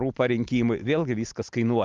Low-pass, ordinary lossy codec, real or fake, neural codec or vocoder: 7.2 kHz; Opus, 32 kbps; real; none